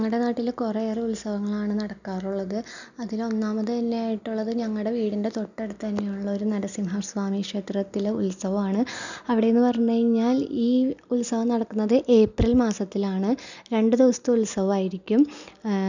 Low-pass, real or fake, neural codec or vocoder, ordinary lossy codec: 7.2 kHz; real; none; none